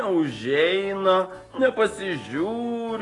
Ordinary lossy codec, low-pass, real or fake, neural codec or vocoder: AAC, 32 kbps; 10.8 kHz; real; none